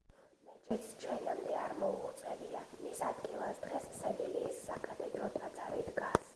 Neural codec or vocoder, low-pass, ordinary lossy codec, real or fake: none; 10.8 kHz; Opus, 16 kbps; real